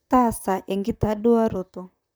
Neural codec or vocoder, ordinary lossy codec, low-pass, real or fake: vocoder, 44.1 kHz, 128 mel bands, Pupu-Vocoder; none; none; fake